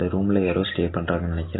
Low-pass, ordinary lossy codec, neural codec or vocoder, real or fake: 7.2 kHz; AAC, 16 kbps; none; real